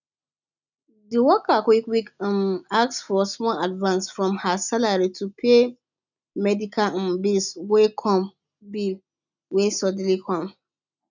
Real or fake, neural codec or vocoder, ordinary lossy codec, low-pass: real; none; none; 7.2 kHz